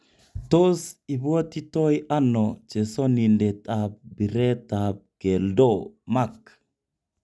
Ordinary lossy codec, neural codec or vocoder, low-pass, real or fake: none; none; none; real